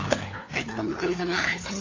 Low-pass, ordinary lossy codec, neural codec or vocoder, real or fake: 7.2 kHz; AAC, 32 kbps; codec, 16 kHz, 4 kbps, FunCodec, trained on LibriTTS, 50 frames a second; fake